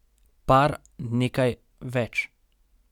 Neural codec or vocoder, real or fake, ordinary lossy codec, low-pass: none; real; none; 19.8 kHz